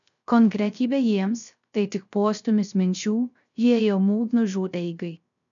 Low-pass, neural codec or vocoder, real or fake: 7.2 kHz; codec, 16 kHz, 0.3 kbps, FocalCodec; fake